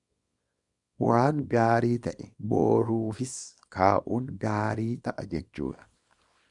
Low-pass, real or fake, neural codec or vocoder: 10.8 kHz; fake; codec, 24 kHz, 0.9 kbps, WavTokenizer, small release